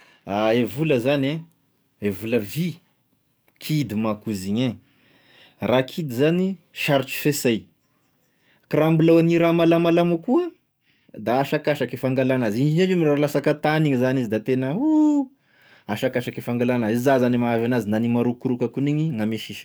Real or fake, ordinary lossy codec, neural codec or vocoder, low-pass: fake; none; codec, 44.1 kHz, 7.8 kbps, DAC; none